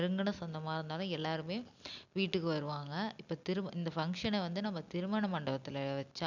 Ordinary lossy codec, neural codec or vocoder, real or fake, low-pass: none; none; real; 7.2 kHz